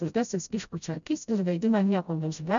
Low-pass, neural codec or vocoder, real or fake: 7.2 kHz; codec, 16 kHz, 0.5 kbps, FreqCodec, smaller model; fake